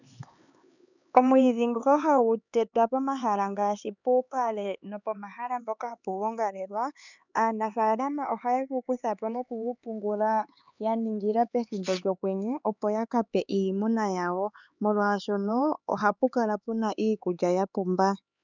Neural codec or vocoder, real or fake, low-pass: codec, 16 kHz, 4 kbps, X-Codec, HuBERT features, trained on LibriSpeech; fake; 7.2 kHz